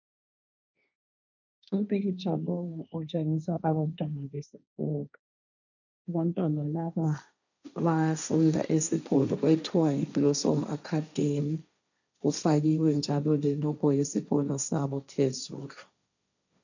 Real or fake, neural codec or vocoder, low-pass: fake; codec, 16 kHz, 1.1 kbps, Voila-Tokenizer; 7.2 kHz